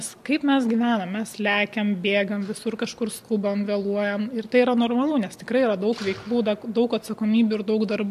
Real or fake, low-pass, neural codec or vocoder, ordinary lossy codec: real; 14.4 kHz; none; MP3, 64 kbps